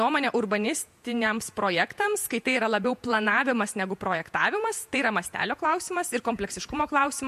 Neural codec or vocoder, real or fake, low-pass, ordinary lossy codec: vocoder, 48 kHz, 128 mel bands, Vocos; fake; 14.4 kHz; MP3, 64 kbps